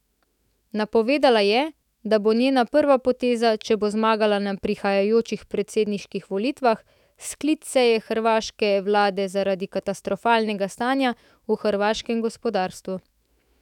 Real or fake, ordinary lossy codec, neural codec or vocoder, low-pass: fake; none; autoencoder, 48 kHz, 128 numbers a frame, DAC-VAE, trained on Japanese speech; 19.8 kHz